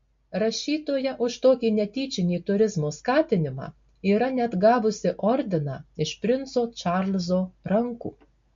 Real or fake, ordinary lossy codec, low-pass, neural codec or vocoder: real; MP3, 48 kbps; 7.2 kHz; none